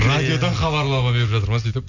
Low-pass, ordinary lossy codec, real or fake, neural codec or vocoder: 7.2 kHz; none; real; none